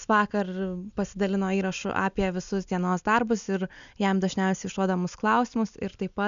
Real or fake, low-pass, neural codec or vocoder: real; 7.2 kHz; none